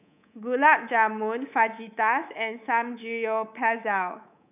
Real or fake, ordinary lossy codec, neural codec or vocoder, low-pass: fake; none; codec, 24 kHz, 3.1 kbps, DualCodec; 3.6 kHz